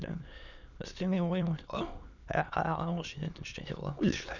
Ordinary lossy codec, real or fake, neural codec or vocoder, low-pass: none; fake; autoencoder, 22.05 kHz, a latent of 192 numbers a frame, VITS, trained on many speakers; 7.2 kHz